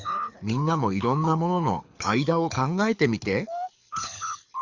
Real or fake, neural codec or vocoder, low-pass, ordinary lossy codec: fake; codec, 24 kHz, 6 kbps, HILCodec; 7.2 kHz; Opus, 64 kbps